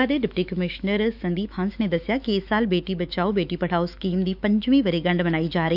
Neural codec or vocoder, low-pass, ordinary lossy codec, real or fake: autoencoder, 48 kHz, 128 numbers a frame, DAC-VAE, trained on Japanese speech; 5.4 kHz; none; fake